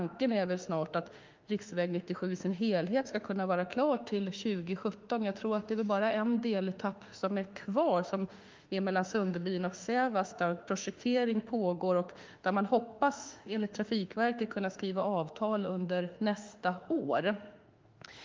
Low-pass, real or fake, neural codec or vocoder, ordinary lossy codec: 7.2 kHz; fake; autoencoder, 48 kHz, 32 numbers a frame, DAC-VAE, trained on Japanese speech; Opus, 32 kbps